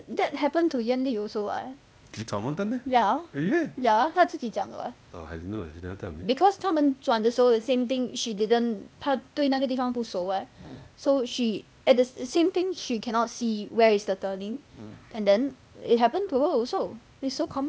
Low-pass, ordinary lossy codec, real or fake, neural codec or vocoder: none; none; fake; codec, 16 kHz, 0.8 kbps, ZipCodec